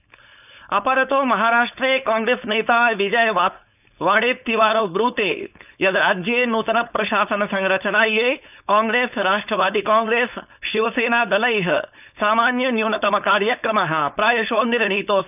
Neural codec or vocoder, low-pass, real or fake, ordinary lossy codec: codec, 16 kHz, 4.8 kbps, FACodec; 3.6 kHz; fake; none